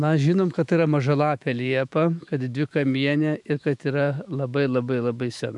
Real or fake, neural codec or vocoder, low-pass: fake; autoencoder, 48 kHz, 128 numbers a frame, DAC-VAE, trained on Japanese speech; 10.8 kHz